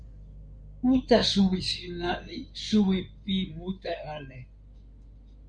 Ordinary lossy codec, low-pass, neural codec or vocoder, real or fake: Opus, 64 kbps; 9.9 kHz; codec, 16 kHz in and 24 kHz out, 2.2 kbps, FireRedTTS-2 codec; fake